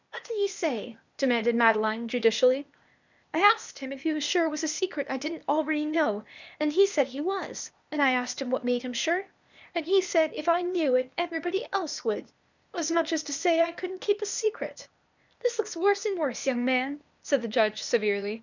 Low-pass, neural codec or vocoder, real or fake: 7.2 kHz; codec, 16 kHz, 0.8 kbps, ZipCodec; fake